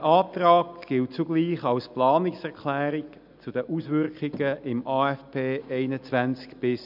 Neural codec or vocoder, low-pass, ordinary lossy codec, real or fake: none; 5.4 kHz; none; real